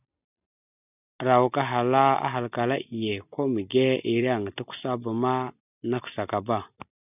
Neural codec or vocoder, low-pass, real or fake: none; 3.6 kHz; real